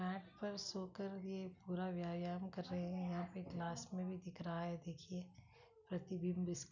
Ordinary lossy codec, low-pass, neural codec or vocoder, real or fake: none; 7.2 kHz; none; real